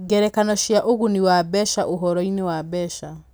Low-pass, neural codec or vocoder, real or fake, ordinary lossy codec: none; none; real; none